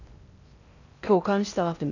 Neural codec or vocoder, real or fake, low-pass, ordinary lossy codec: codec, 16 kHz in and 24 kHz out, 0.6 kbps, FocalCodec, streaming, 4096 codes; fake; 7.2 kHz; none